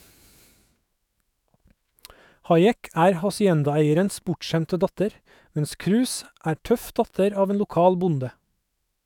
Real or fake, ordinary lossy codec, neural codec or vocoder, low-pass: fake; none; autoencoder, 48 kHz, 128 numbers a frame, DAC-VAE, trained on Japanese speech; 19.8 kHz